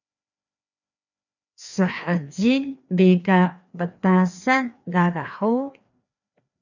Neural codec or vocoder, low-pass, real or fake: codec, 16 kHz, 2 kbps, FreqCodec, larger model; 7.2 kHz; fake